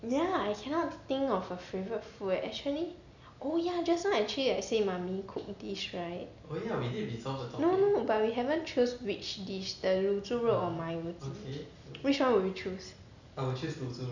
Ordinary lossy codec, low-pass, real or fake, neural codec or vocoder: none; 7.2 kHz; real; none